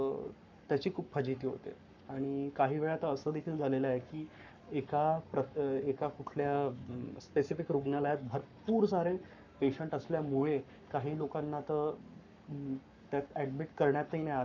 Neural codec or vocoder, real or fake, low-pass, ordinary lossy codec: codec, 44.1 kHz, 7.8 kbps, Pupu-Codec; fake; 7.2 kHz; none